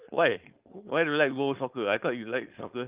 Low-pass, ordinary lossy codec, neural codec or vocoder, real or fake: 3.6 kHz; Opus, 32 kbps; codec, 16 kHz, 4.8 kbps, FACodec; fake